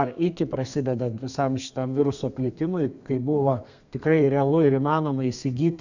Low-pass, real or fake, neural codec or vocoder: 7.2 kHz; fake; codec, 32 kHz, 1.9 kbps, SNAC